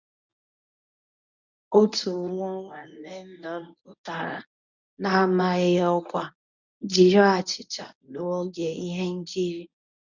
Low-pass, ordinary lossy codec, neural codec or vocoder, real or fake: 7.2 kHz; none; codec, 24 kHz, 0.9 kbps, WavTokenizer, medium speech release version 1; fake